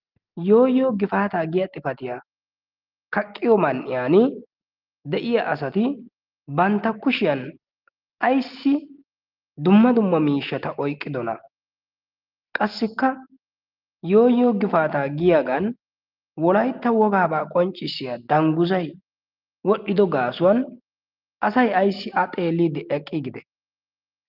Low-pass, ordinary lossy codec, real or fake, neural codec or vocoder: 5.4 kHz; Opus, 32 kbps; real; none